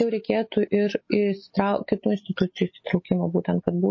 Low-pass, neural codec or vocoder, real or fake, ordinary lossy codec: 7.2 kHz; none; real; MP3, 32 kbps